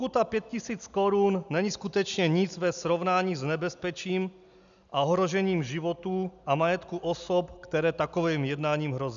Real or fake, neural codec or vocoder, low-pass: real; none; 7.2 kHz